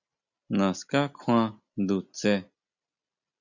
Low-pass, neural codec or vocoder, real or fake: 7.2 kHz; none; real